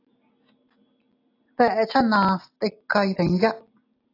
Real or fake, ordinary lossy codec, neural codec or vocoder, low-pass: real; AAC, 32 kbps; none; 5.4 kHz